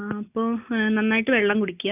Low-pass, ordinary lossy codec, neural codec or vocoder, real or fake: 3.6 kHz; none; none; real